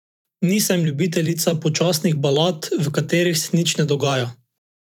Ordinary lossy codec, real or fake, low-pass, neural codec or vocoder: none; fake; 19.8 kHz; vocoder, 44.1 kHz, 128 mel bands every 512 samples, BigVGAN v2